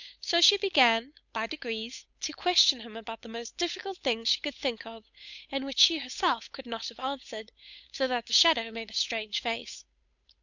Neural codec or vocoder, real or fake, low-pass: codec, 16 kHz, 8 kbps, FunCodec, trained on Chinese and English, 25 frames a second; fake; 7.2 kHz